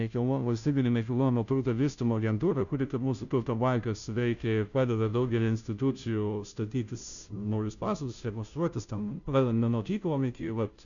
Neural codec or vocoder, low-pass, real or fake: codec, 16 kHz, 0.5 kbps, FunCodec, trained on Chinese and English, 25 frames a second; 7.2 kHz; fake